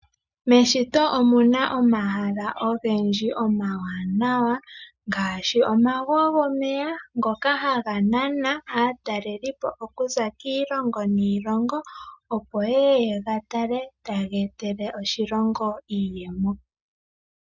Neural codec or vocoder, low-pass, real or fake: none; 7.2 kHz; real